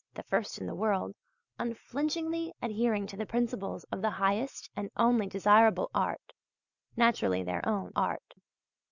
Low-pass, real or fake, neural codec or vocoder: 7.2 kHz; real; none